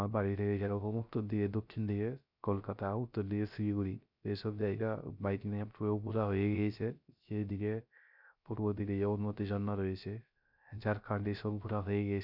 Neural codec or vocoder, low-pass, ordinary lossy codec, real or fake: codec, 16 kHz, 0.3 kbps, FocalCodec; 5.4 kHz; none; fake